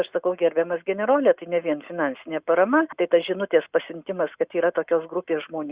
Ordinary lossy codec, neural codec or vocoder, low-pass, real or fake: Opus, 64 kbps; none; 3.6 kHz; real